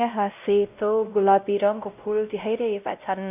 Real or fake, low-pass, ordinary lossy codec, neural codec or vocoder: fake; 3.6 kHz; none; codec, 16 kHz, 0.5 kbps, X-Codec, WavLM features, trained on Multilingual LibriSpeech